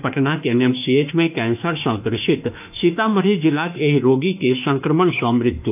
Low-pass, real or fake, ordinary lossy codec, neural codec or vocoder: 3.6 kHz; fake; AAC, 32 kbps; autoencoder, 48 kHz, 32 numbers a frame, DAC-VAE, trained on Japanese speech